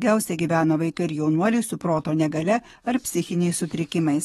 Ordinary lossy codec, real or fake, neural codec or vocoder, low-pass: AAC, 32 kbps; fake; codec, 44.1 kHz, 7.8 kbps, DAC; 19.8 kHz